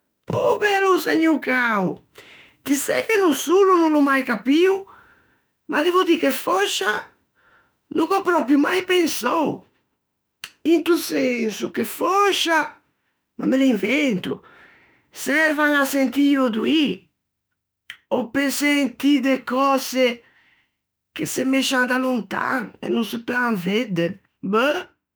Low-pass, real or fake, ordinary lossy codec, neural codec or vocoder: none; fake; none; autoencoder, 48 kHz, 32 numbers a frame, DAC-VAE, trained on Japanese speech